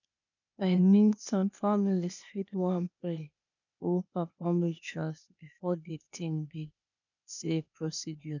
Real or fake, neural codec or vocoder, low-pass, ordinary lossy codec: fake; codec, 16 kHz, 0.8 kbps, ZipCodec; 7.2 kHz; none